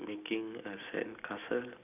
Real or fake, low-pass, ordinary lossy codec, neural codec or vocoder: real; 3.6 kHz; none; none